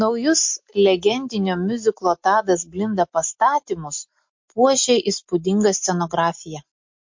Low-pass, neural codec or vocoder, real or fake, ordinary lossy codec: 7.2 kHz; none; real; MP3, 48 kbps